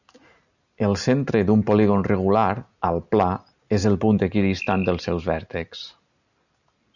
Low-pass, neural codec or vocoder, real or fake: 7.2 kHz; none; real